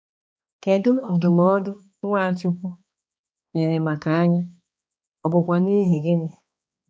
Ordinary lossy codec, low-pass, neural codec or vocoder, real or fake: none; none; codec, 16 kHz, 2 kbps, X-Codec, HuBERT features, trained on balanced general audio; fake